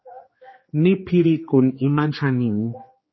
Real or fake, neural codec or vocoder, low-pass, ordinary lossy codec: fake; codec, 16 kHz, 2 kbps, X-Codec, HuBERT features, trained on general audio; 7.2 kHz; MP3, 24 kbps